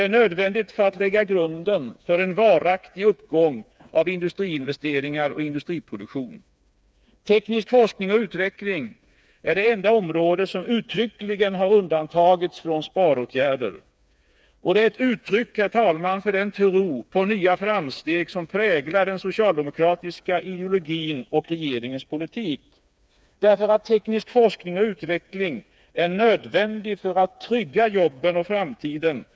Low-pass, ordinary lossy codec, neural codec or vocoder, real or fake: none; none; codec, 16 kHz, 4 kbps, FreqCodec, smaller model; fake